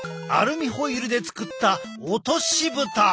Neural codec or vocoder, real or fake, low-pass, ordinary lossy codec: none; real; none; none